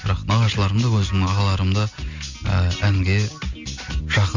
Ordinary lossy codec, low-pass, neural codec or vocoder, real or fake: MP3, 64 kbps; 7.2 kHz; none; real